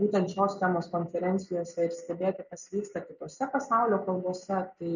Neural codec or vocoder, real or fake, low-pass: none; real; 7.2 kHz